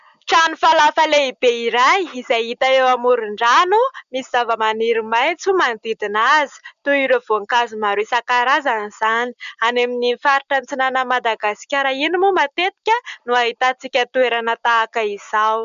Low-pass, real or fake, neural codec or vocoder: 7.2 kHz; real; none